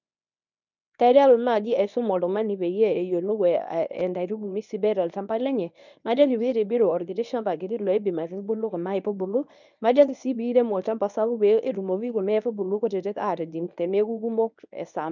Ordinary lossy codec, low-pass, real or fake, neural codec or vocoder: none; 7.2 kHz; fake; codec, 24 kHz, 0.9 kbps, WavTokenizer, medium speech release version 1